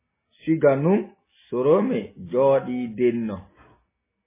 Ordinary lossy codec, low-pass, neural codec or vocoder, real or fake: MP3, 16 kbps; 3.6 kHz; none; real